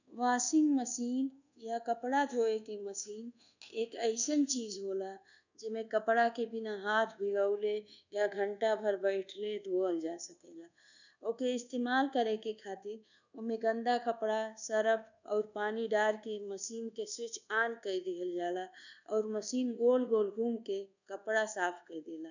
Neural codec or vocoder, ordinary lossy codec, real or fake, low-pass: codec, 24 kHz, 1.2 kbps, DualCodec; none; fake; 7.2 kHz